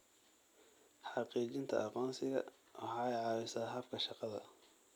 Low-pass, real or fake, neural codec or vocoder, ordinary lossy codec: none; real; none; none